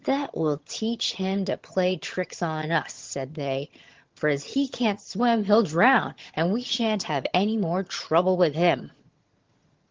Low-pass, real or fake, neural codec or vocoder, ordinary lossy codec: 7.2 kHz; fake; vocoder, 22.05 kHz, 80 mel bands, HiFi-GAN; Opus, 16 kbps